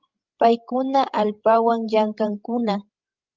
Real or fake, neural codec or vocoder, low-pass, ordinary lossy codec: fake; codec, 16 kHz, 16 kbps, FreqCodec, larger model; 7.2 kHz; Opus, 32 kbps